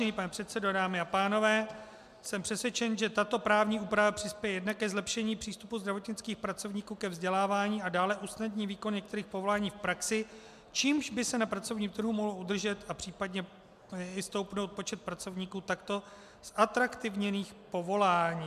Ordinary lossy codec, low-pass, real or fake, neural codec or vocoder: MP3, 96 kbps; 14.4 kHz; real; none